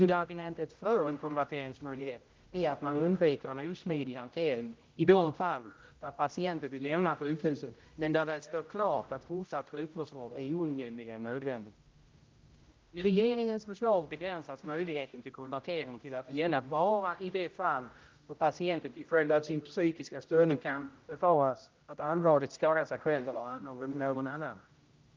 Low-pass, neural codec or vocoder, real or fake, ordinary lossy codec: 7.2 kHz; codec, 16 kHz, 0.5 kbps, X-Codec, HuBERT features, trained on general audio; fake; Opus, 24 kbps